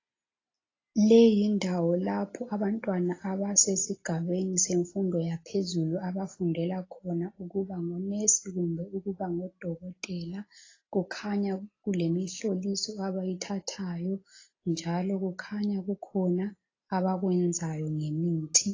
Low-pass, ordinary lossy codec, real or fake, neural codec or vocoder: 7.2 kHz; AAC, 32 kbps; real; none